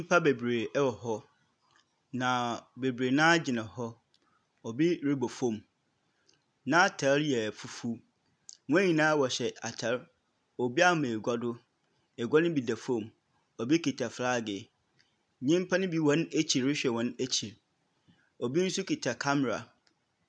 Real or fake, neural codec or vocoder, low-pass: real; none; 9.9 kHz